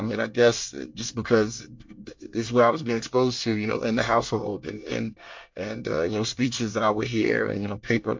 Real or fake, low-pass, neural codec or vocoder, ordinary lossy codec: fake; 7.2 kHz; codec, 24 kHz, 1 kbps, SNAC; MP3, 48 kbps